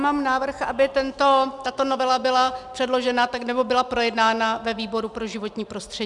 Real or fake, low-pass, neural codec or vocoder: real; 10.8 kHz; none